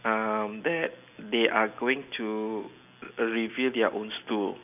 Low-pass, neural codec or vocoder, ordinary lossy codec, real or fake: 3.6 kHz; none; none; real